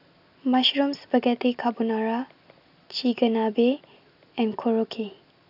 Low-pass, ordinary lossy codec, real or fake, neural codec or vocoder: 5.4 kHz; none; real; none